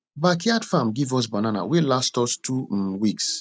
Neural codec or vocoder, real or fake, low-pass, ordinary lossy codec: none; real; none; none